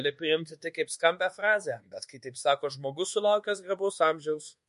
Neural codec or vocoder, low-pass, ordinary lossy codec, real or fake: codec, 24 kHz, 1.2 kbps, DualCodec; 10.8 kHz; MP3, 48 kbps; fake